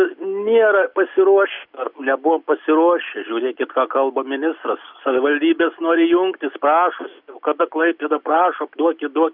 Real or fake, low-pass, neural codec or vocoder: real; 5.4 kHz; none